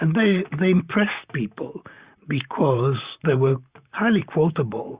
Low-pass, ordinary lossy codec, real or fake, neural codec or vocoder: 3.6 kHz; Opus, 64 kbps; fake; vocoder, 44.1 kHz, 128 mel bands, Pupu-Vocoder